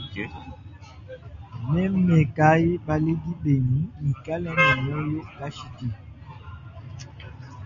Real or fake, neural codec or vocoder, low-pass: real; none; 7.2 kHz